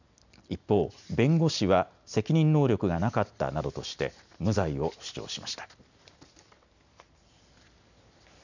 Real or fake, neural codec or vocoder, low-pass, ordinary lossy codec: real; none; 7.2 kHz; none